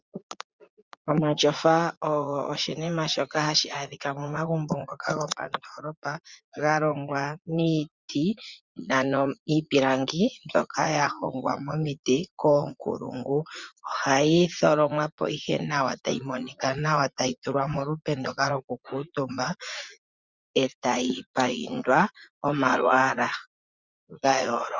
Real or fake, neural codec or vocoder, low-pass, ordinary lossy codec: fake; vocoder, 44.1 kHz, 80 mel bands, Vocos; 7.2 kHz; Opus, 64 kbps